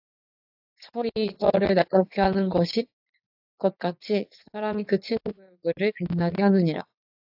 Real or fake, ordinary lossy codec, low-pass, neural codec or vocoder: fake; AAC, 48 kbps; 5.4 kHz; vocoder, 44.1 kHz, 80 mel bands, Vocos